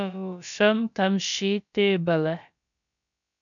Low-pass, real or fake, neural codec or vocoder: 7.2 kHz; fake; codec, 16 kHz, about 1 kbps, DyCAST, with the encoder's durations